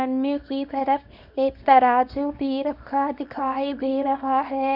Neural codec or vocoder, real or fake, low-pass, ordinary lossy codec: codec, 24 kHz, 0.9 kbps, WavTokenizer, small release; fake; 5.4 kHz; none